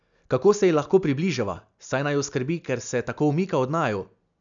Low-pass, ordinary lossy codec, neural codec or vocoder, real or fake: 7.2 kHz; MP3, 96 kbps; none; real